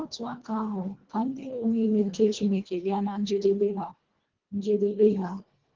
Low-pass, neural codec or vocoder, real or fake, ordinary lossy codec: 7.2 kHz; codec, 24 kHz, 1.5 kbps, HILCodec; fake; Opus, 16 kbps